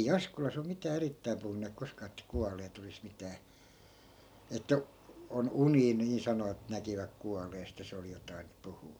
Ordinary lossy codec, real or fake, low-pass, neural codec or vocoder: none; real; none; none